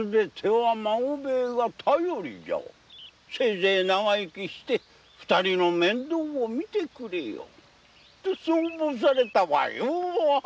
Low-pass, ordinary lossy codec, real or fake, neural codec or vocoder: none; none; real; none